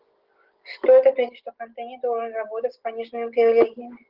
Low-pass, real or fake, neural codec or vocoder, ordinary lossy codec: 5.4 kHz; real; none; Opus, 16 kbps